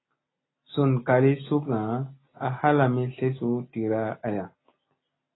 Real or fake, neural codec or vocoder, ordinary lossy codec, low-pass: real; none; AAC, 16 kbps; 7.2 kHz